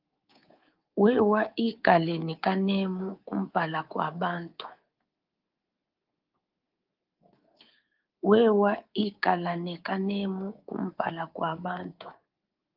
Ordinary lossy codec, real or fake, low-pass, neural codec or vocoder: Opus, 32 kbps; fake; 5.4 kHz; codec, 24 kHz, 3.1 kbps, DualCodec